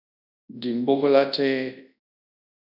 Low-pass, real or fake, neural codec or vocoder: 5.4 kHz; fake; codec, 24 kHz, 0.9 kbps, WavTokenizer, large speech release